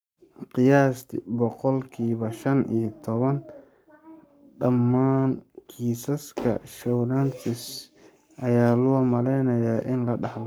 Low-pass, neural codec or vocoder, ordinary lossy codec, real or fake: none; codec, 44.1 kHz, 7.8 kbps, Pupu-Codec; none; fake